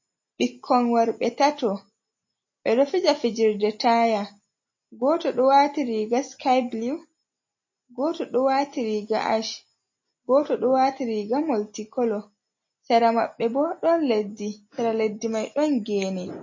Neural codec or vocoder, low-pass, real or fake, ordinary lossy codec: none; 7.2 kHz; real; MP3, 32 kbps